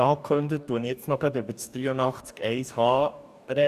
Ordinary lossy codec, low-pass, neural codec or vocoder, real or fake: none; 14.4 kHz; codec, 44.1 kHz, 2.6 kbps, DAC; fake